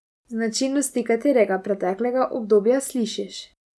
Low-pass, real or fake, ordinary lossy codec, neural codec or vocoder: none; real; none; none